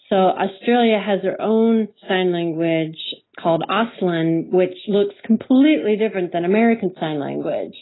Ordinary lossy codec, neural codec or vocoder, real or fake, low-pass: AAC, 16 kbps; none; real; 7.2 kHz